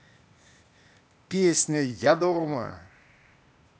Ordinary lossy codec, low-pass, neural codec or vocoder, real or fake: none; none; codec, 16 kHz, 0.8 kbps, ZipCodec; fake